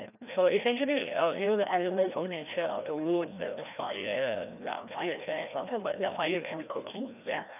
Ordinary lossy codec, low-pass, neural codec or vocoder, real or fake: none; 3.6 kHz; codec, 16 kHz, 1 kbps, FreqCodec, larger model; fake